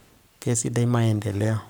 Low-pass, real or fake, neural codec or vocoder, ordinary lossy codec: none; fake; codec, 44.1 kHz, 7.8 kbps, Pupu-Codec; none